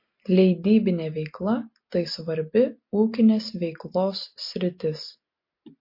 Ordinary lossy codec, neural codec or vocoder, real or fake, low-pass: MP3, 32 kbps; none; real; 5.4 kHz